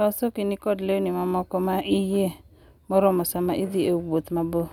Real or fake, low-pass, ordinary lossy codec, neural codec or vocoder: real; 19.8 kHz; none; none